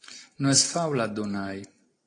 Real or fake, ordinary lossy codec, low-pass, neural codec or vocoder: real; AAC, 32 kbps; 9.9 kHz; none